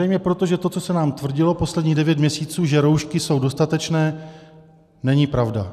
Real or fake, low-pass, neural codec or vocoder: real; 14.4 kHz; none